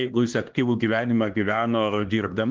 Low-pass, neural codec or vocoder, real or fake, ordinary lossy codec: 7.2 kHz; codec, 16 kHz, 2 kbps, X-Codec, HuBERT features, trained on LibriSpeech; fake; Opus, 16 kbps